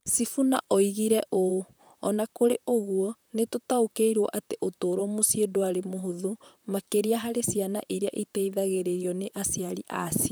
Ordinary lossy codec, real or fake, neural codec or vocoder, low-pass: none; fake; vocoder, 44.1 kHz, 128 mel bands, Pupu-Vocoder; none